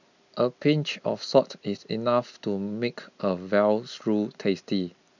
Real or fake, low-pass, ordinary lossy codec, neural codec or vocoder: real; 7.2 kHz; none; none